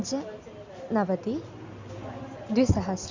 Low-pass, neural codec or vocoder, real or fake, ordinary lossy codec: 7.2 kHz; none; real; MP3, 64 kbps